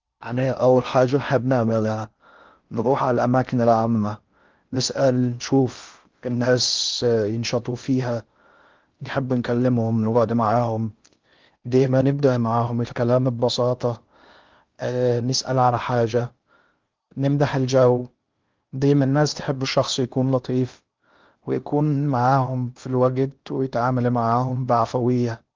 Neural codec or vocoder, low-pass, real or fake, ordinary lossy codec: codec, 16 kHz in and 24 kHz out, 0.6 kbps, FocalCodec, streaming, 4096 codes; 7.2 kHz; fake; Opus, 24 kbps